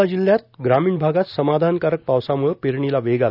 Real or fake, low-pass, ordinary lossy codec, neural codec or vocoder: real; 5.4 kHz; none; none